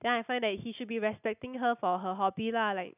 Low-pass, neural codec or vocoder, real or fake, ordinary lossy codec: 3.6 kHz; none; real; none